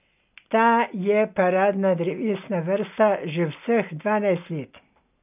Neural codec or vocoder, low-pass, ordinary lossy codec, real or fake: none; 3.6 kHz; none; real